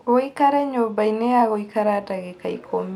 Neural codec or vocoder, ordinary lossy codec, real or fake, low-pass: none; none; real; 19.8 kHz